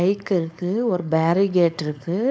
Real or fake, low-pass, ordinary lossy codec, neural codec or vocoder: fake; none; none; codec, 16 kHz, 4.8 kbps, FACodec